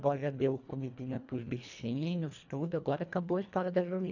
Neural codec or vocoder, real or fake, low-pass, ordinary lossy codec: codec, 24 kHz, 1.5 kbps, HILCodec; fake; 7.2 kHz; none